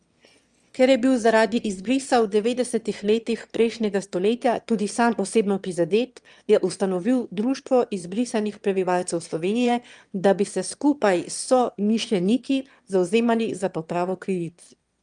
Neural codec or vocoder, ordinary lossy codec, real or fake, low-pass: autoencoder, 22.05 kHz, a latent of 192 numbers a frame, VITS, trained on one speaker; Opus, 32 kbps; fake; 9.9 kHz